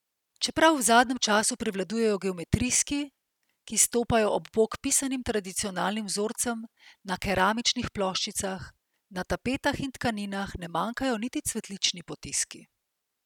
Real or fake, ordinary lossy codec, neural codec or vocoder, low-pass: real; none; none; 19.8 kHz